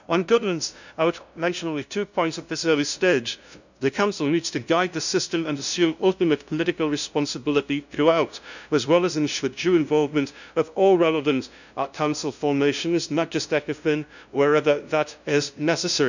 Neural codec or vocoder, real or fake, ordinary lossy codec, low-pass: codec, 16 kHz, 0.5 kbps, FunCodec, trained on LibriTTS, 25 frames a second; fake; none; 7.2 kHz